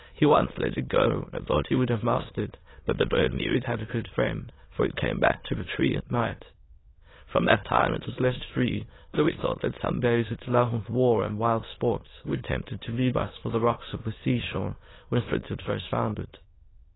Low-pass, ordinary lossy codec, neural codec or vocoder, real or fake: 7.2 kHz; AAC, 16 kbps; autoencoder, 22.05 kHz, a latent of 192 numbers a frame, VITS, trained on many speakers; fake